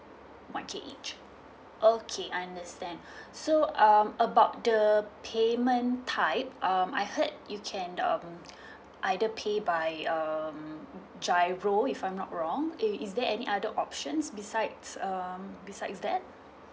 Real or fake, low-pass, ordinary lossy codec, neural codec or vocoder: real; none; none; none